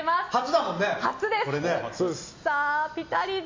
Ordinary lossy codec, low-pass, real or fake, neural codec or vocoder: MP3, 64 kbps; 7.2 kHz; real; none